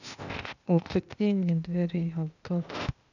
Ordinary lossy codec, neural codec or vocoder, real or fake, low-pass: none; codec, 16 kHz, 0.8 kbps, ZipCodec; fake; 7.2 kHz